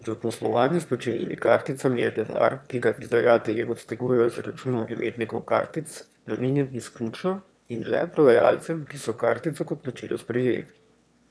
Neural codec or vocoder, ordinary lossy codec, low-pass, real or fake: autoencoder, 22.05 kHz, a latent of 192 numbers a frame, VITS, trained on one speaker; none; none; fake